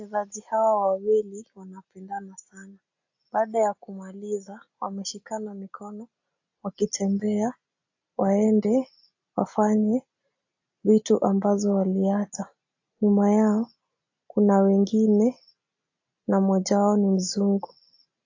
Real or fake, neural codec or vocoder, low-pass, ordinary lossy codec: real; none; 7.2 kHz; AAC, 48 kbps